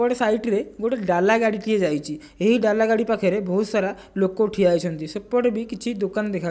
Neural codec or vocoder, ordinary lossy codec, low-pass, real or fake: none; none; none; real